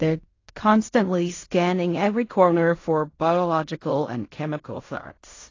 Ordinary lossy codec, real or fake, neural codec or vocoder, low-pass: AAC, 32 kbps; fake; codec, 16 kHz in and 24 kHz out, 0.4 kbps, LongCat-Audio-Codec, fine tuned four codebook decoder; 7.2 kHz